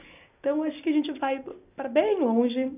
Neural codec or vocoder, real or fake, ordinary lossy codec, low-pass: none; real; none; 3.6 kHz